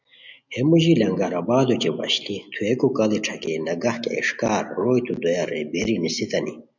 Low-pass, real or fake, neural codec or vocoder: 7.2 kHz; real; none